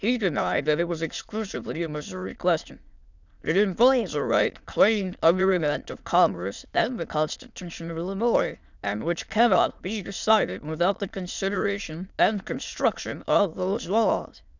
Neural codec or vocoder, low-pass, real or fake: autoencoder, 22.05 kHz, a latent of 192 numbers a frame, VITS, trained on many speakers; 7.2 kHz; fake